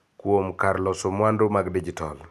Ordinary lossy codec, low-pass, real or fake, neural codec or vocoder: none; 14.4 kHz; real; none